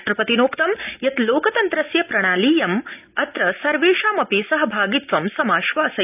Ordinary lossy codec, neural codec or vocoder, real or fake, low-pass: none; none; real; 3.6 kHz